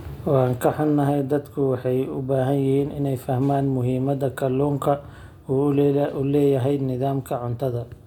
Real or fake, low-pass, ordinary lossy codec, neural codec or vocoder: real; 19.8 kHz; none; none